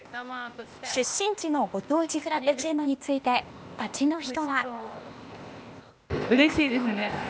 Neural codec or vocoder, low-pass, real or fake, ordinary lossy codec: codec, 16 kHz, 0.8 kbps, ZipCodec; none; fake; none